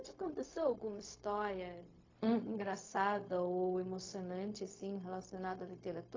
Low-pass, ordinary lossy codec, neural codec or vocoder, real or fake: 7.2 kHz; AAC, 32 kbps; codec, 16 kHz, 0.4 kbps, LongCat-Audio-Codec; fake